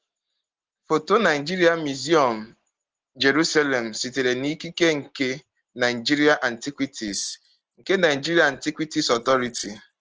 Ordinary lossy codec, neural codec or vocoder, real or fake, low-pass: Opus, 16 kbps; none; real; 7.2 kHz